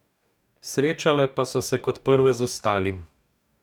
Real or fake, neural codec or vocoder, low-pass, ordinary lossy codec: fake; codec, 44.1 kHz, 2.6 kbps, DAC; 19.8 kHz; none